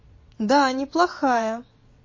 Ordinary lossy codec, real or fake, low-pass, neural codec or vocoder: MP3, 32 kbps; fake; 7.2 kHz; vocoder, 22.05 kHz, 80 mel bands, WaveNeXt